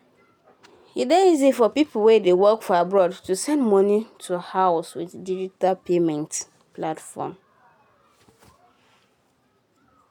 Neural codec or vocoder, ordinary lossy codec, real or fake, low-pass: none; none; real; 19.8 kHz